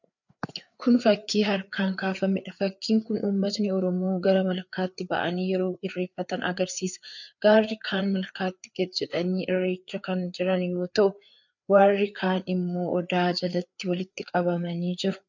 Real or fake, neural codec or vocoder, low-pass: fake; codec, 16 kHz, 4 kbps, FreqCodec, larger model; 7.2 kHz